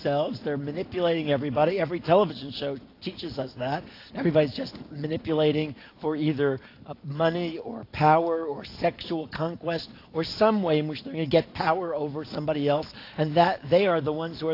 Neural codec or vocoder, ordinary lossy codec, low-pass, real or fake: none; AAC, 32 kbps; 5.4 kHz; real